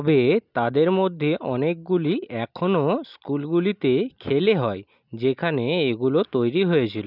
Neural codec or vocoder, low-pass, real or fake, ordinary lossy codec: none; 5.4 kHz; real; none